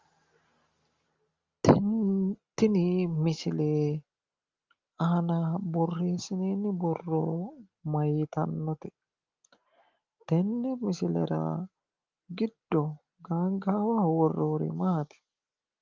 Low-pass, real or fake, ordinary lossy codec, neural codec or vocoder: 7.2 kHz; real; Opus, 32 kbps; none